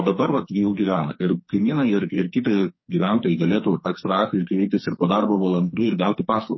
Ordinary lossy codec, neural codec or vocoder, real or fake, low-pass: MP3, 24 kbps; codec, 44.1 kHz, 2.6 kbps, SNAC; fake; 7.2 kHz